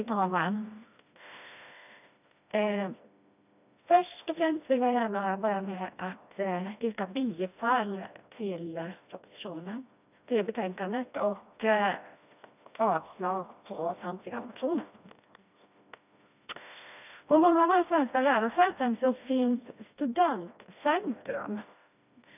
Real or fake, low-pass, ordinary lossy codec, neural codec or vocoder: fake; 3.6 kHz; none; codec, 16 kHz, 1 kbps, FreqCodec, smaller model